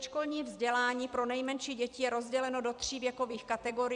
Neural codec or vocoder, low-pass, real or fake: vocoder, 44.1 kHz, 128 mel bands every 256 samples, BigVGAN v2; 10.8 kHz; fake